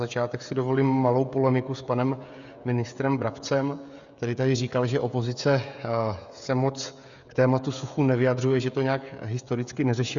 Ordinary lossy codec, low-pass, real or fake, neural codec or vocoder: Opus, 64 kbps; 7.2 kHz; fake; codec, 16 kHz, 16 kbps, FreqCodec, smaller model